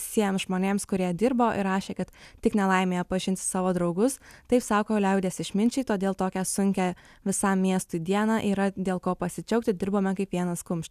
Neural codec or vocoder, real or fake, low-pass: none; real; 14.4 kHz